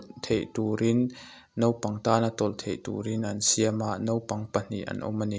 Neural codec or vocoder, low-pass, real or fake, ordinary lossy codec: none; none; real; none